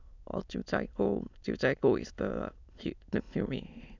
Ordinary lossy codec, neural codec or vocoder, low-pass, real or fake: none; autoencoder, 22.05 kHz, a latent of 192 numbers a frame, VITS, trained on many speakers; 7.2 kHz; fake